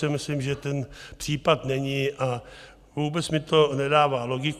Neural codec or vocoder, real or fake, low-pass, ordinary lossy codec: none; real; 14.4 kHz; Opus, 64 kbps